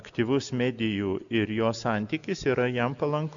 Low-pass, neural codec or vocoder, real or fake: 7.2 kHz; none; real